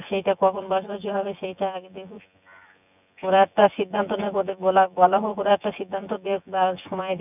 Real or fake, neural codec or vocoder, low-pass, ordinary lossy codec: fake; vocoder, 24 kHz, 100 mel bands, Vocos; 3.6 kHz; none